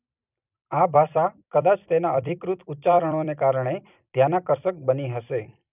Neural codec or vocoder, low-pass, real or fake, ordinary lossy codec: vocoder, 44.1 kHz, 128 mel bands every 256 samples, BigVGAN v2; 3.6 kHz; fake; none